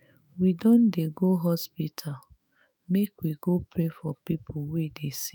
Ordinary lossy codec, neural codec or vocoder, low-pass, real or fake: none; autoencoder, 48 kHz, 128 numbers a frame, DAC-VAE, trained on Japanese speech; none; fake